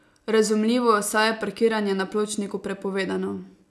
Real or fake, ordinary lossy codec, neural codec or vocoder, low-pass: real; none; none; none